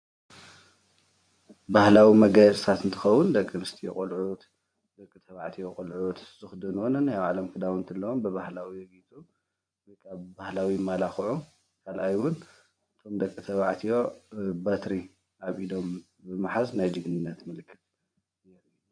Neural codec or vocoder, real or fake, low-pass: vocoder, 24 kHz, 100 mel bands, Vocos; fake; 9.9 kHz